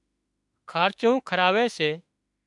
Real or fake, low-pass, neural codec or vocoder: fake; 10.8 kHz; autoencoder, 48 kHz, 32 numbers a frame, DAC-VAE, trained on Japanese speech